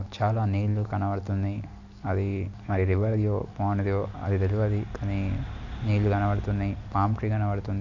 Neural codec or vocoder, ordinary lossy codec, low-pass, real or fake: none; none; 7.2 kHz; real